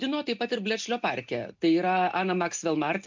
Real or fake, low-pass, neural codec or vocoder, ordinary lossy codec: real; 7.2 kHz; none; MP3, 64 kbps